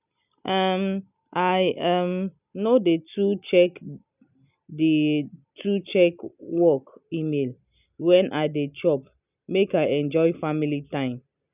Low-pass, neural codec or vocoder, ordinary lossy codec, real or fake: 3.6 kHz; none; none; real